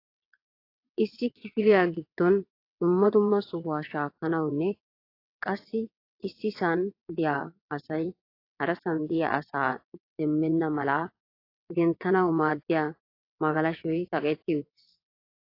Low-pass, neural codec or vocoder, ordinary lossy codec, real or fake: 5.4 kHz; vocoder, 22.05 kHz, 80 mel bands, WaveNeXt; AAC, 32 kbps; fake